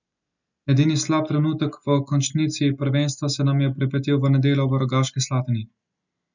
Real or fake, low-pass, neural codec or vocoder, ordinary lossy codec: real; 7.2 kHz; none; none